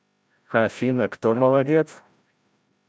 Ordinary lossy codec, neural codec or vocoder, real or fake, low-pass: none; codec, 16 kHz, 0.5 kbps, FreqCodec, larger model; fake; none